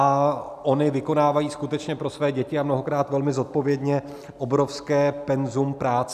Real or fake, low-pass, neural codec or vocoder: real; 14.4 kHz; none